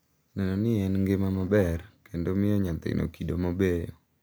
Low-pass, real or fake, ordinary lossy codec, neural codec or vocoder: none; real; none; none